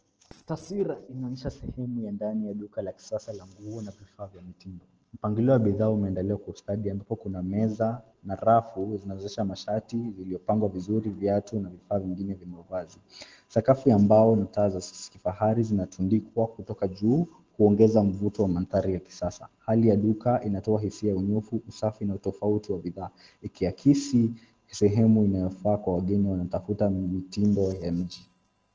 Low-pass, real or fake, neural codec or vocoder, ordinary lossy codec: 7.2 kHz; real; none; Opus, 16 kbps